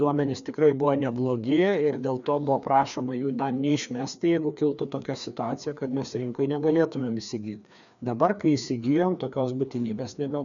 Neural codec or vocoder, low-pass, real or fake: codec, 16 kHz, 2 kbps, FreqCodec, larger model; 7.2 kHz; fake